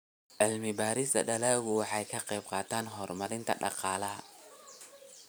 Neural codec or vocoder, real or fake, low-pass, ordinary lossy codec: vocoder, 44.1 kHz, 128 mel bands every 512 samples, BigVGAN v2; fake; none; none